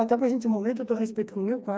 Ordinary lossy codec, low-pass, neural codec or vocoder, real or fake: none; none; codec, 16 kHz, 2 kbps, FreqCodec, smaller model; fake